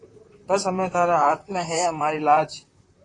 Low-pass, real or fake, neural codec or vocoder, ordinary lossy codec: 10.8 kHz; fake; vocoder, 44.1 kHz, 128 mel bands, Pupu-Vocoder; AAC, 32 kbps